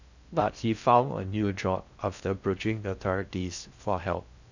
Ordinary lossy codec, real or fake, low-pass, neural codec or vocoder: none; fake; 7.2 kHz; codec, 16 kHz in and 24 kHz out, 0.6 kbps, FocalCodec, streaming, 2048 codes